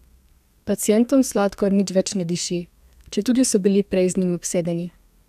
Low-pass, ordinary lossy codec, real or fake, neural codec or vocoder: 14.4 kHz; none; fake; codec, 32 kHz, 1.9 kbps, SNAC